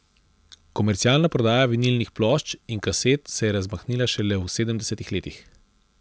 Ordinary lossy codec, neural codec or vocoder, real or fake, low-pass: none; none; real; none